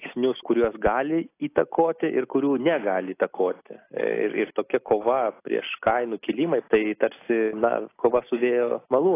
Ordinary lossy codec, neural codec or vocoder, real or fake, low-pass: AAC, 24 kbps; none; real; 3.6 kHz